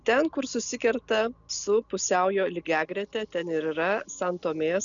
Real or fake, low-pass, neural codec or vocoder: real; 7.2 kHz; none